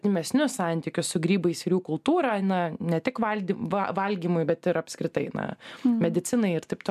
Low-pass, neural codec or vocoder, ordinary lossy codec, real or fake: 14.4 kHz; none; MP3, 96 kbps; real